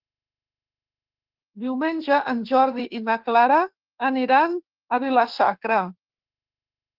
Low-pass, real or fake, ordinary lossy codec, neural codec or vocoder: 5.4 kHz; fake; Opus, 16 kbps; autoencoder, 48 kHz, 32 numbers a frame, DAC-VAE, trained on Japanese speech